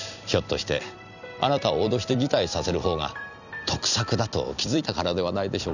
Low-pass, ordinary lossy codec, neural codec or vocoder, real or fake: 7.2 kHz; none; vocoder, 44.1 kHz, 128 mel bands every 256 samples, BigVGAN v2; fake